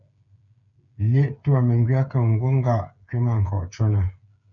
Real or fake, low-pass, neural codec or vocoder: fake; 7.2 kHz; codec, 16 kHz, 8 kbps, FreqCodec, smaller model